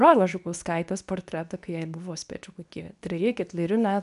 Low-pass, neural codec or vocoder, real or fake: 10.8 kHz; codec, 24 kHz, 0.9 kbps, WavTokenizer, medium speech release version 2; fake